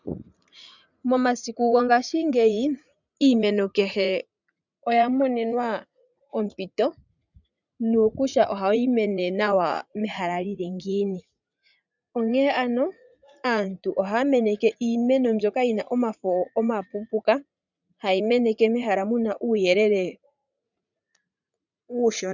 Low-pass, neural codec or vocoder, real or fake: 7.2 kHz; vocoder, 44.1 kHz, 80 mel bands, Vocos; fake